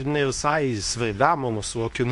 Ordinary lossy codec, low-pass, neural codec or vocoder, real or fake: AAC, 64 kbps; 10.8 kHz; codec, 24 kHz, 0.9 kbps, WavTokenizer, medium speech release version 2; fake